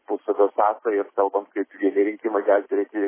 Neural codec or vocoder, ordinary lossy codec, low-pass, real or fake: none; MP3, 16 kbps; 3.6 kHz; real